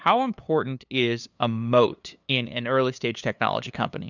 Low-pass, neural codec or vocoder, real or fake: 7.2 kHz; codec, 16 kHz, 2 kbps, FunCodec, trained on LibriTTS, 25 frames a second; fake